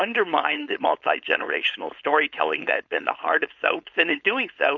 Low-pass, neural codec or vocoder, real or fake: 7.2 kHz; codec, 16 kHz, 4.8 kbps, FACodec; fake